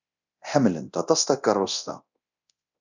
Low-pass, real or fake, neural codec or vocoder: 7.2 kHz; fake; codec, 24 kHz, 0.9 kbps, DualCodec